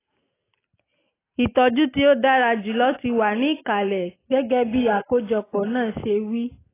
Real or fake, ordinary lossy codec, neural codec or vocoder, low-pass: real; AAC, 16 kbps; none; 3.6 kHz